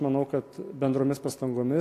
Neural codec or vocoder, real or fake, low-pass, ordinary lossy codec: none; real; 14.4 kHz; AAC, 64 kbps